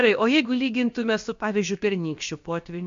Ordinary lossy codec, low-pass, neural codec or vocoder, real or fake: MP3, 48 kbps; 7.2 kHz; codec, 16 kHz, about 1 kbps, DyCAST, with the encoder's durations; fake